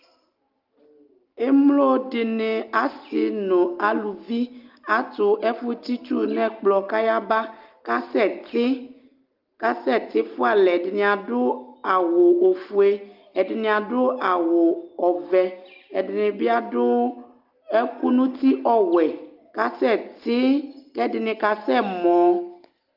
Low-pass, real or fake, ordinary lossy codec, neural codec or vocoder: 5.4 kHz; real; Opus, 24 kbps; none